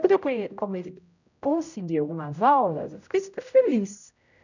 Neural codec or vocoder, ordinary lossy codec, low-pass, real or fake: codec, 16 kHz, 0.5 kbps, X-Codec, HuBERT features, trained on general audio; none; 7.2 kHz; fake